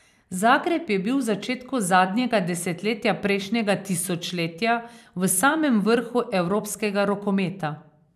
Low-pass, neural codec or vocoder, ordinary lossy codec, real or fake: 14.4 kHz; vocoder, 44.1 kHz, 128 mel bands every 256 samples, BigVGAN v2; none; fake